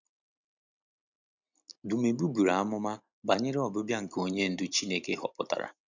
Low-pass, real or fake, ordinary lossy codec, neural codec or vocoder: 7.2 kHz; real; none; none